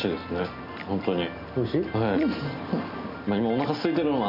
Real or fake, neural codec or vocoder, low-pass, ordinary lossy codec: real; none; 5.4 kHz; none